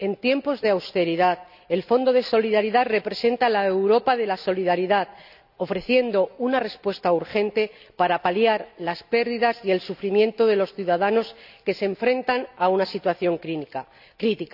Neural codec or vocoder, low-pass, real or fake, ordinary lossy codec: none; 5.4 kHz; real; none